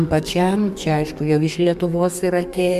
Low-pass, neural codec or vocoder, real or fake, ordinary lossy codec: 14.4 kHz; codec, 32 kHz, 1.9 kbps, SNAC; fake; MP3, 96 kbps